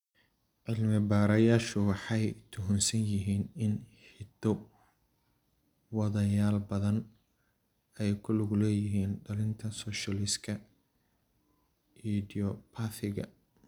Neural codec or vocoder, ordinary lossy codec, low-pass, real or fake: none; none; 19.8 kHz; real